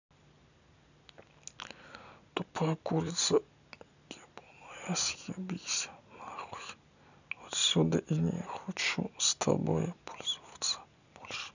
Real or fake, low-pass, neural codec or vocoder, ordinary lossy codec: real; 7.2 kHz; none; none